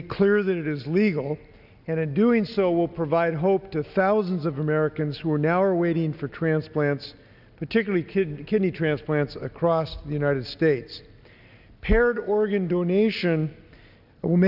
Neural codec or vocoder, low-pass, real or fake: none; 5.4 kHz; real